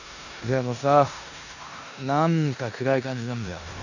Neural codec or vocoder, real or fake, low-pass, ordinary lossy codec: codec, 16 kHz in and 24 kHz out, 0.9 kbps, LongCat-Audio-Codec, four codebook decoder; fake; 7.2 kHz; none